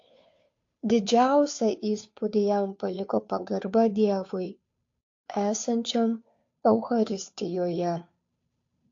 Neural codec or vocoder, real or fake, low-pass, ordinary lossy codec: codec, 16 kHz, 2 kbps, FunCodec, trained on Chinese and English, 25 frames a second; fake; 7.2 kHz; AAC, 48 kbps